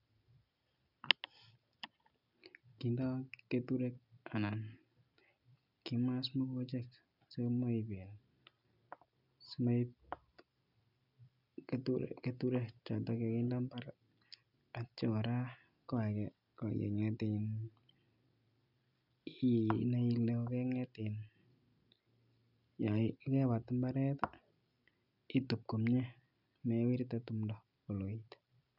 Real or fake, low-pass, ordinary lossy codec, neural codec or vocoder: real; 5.4 kHz; none; none